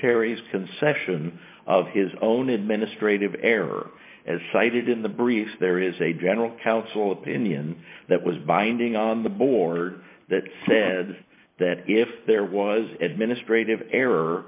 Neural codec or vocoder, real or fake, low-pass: none; real; 3.6 kHz